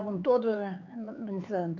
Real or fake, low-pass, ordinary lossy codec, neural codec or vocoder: fake; 7.2 kHz; none; codec, 16 kHz, 4 kbps, X-Codec, HuBERT features, trained on LibriSpeech